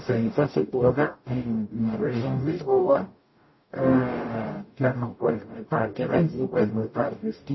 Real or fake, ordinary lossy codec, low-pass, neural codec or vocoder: fake; MP3, 24 kbps; 7.2 kHz; codec, 44.1 kHz, 0.9 kbps, DAC